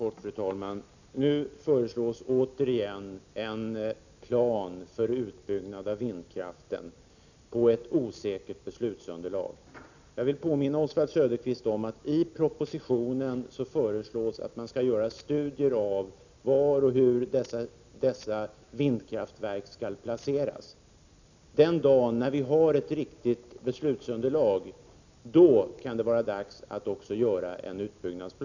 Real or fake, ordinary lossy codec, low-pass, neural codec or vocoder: real; none; 7.2 kHz; none